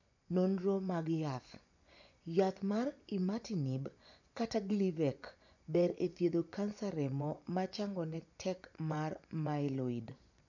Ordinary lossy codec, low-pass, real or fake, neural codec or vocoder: none; 7.2 kHz; fake; vocoder, 24 kHz, 100 mel bands, Vocos